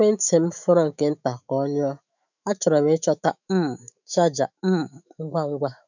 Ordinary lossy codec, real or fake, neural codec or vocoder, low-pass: none; fake; autoencoder, 48 kHz, 128 numbers a frame, DAC-VAE, trained on Japanese speech; 7.2 kHz